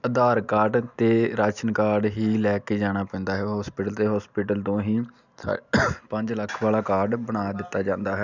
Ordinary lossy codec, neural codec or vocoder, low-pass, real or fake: none; none; 7.2 kHz; real